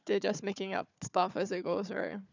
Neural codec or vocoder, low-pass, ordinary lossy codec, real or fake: codec, 16 kHz, 4 kbps, FunCodec, trained on Chinese and English, 50 frames a second; 7.2 kHz; none; fake